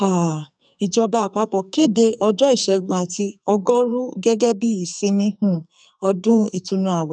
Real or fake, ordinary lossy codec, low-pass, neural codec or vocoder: fake; none; 9.9 kHz; codec, 44.1 kHz, 2.6 kbps, SNAC